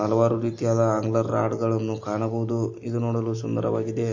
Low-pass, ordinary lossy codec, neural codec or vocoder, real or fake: 7.2 kHz; MP3, 32 kbps; none; real